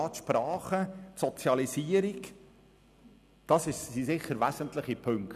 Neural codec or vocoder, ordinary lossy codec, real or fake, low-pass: none; none; real; 14.4 kHz